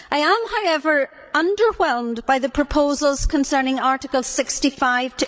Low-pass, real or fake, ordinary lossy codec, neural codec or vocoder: none; fake; none; codec, 16 kHz, 16 kbps, FreqCodec, larger model